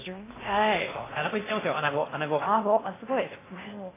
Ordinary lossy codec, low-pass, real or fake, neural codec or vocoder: AAC, 16 kbps; 3.6 kHz; fake; codec, 16 kHz in and 24 kHz out, 0.8 kbps, FocalCodec, streaming, 65536 codes